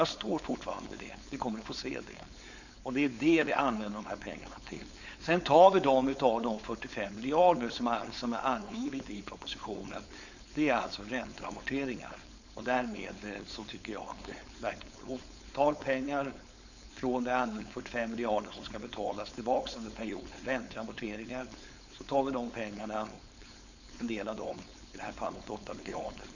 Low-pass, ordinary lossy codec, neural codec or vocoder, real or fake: 7.2 kHz; none; codec, 16 kHz, 4.8 kbps, FACodec; fake